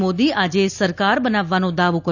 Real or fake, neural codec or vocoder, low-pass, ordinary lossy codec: real; none; 7.2 kHz; none